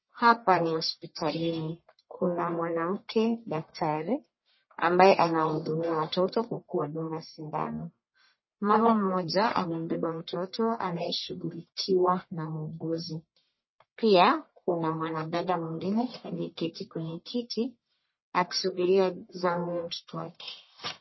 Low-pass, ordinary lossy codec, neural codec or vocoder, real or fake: 7.2 kHz; MP3, 24 kbps; codec, 44.1 kHz, 1.7 kbps, Pupu-Codec; fake